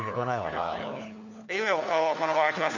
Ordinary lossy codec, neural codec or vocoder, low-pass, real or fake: none; codec, 16 kHz, 4 kbps, FunCodec, trained on LibriTTS, 50 frames a second; 7.2 kHz; fake